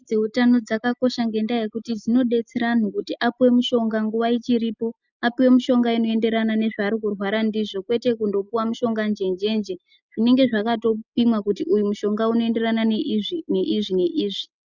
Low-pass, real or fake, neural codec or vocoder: 7.2 kHz; real; none